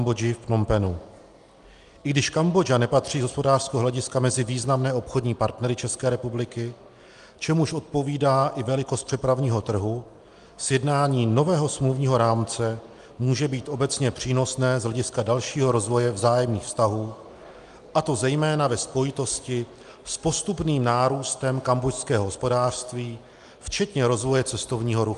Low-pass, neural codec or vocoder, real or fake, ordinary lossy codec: 10.8 kHz; none; real; Opus, 32 kbps